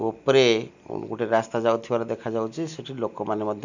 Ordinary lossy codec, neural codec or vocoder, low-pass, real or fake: none; none; 7.2 kHz; real